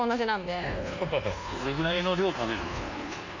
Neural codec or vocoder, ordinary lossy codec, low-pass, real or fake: codec, 24 kHz, 1.2 kbps, DualCodec; none; 7.2 kHz; fake